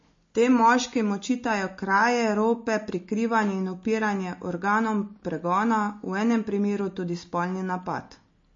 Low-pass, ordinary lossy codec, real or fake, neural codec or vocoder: 7.2 kHz; MP3, 32 kbps; real; none